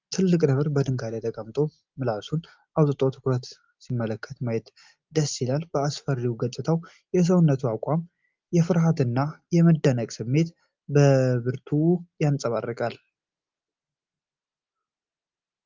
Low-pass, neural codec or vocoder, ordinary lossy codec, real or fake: 7.2 kHz; none; Opus, 32 kbps; real